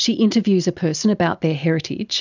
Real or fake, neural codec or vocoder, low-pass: real; none; 7.2 kHz